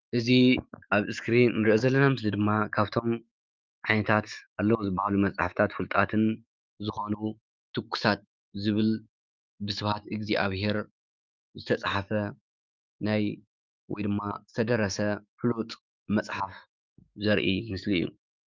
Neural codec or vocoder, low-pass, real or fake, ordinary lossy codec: autoencoder, 48 kHz, 128 numbers a frame, DAC-VAE, trained on Japanese speech; 7.2 kHz; fake; Opus, 24 kbps